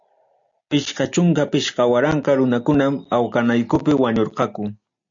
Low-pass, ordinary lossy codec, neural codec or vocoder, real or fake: 7.2 kHz; AAC, 64 kbps; none; real